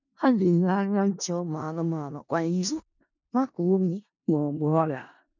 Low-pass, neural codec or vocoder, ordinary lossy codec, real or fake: 7.2 kHz; codec, 16 kHz in and 24 kHz out, 0.4 kbps, LongCat-Audio-Codec, four codebook decoder; none; fake